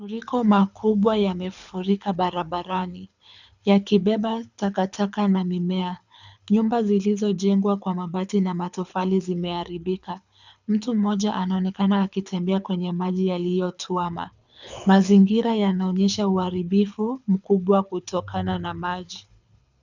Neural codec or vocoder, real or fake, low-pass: codec, 24 kHz, 6 kbps, HILCodec; fake; 7.2 kHz